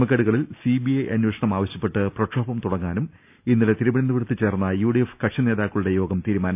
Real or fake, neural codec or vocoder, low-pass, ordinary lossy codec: real; none; 3.6 kHz; none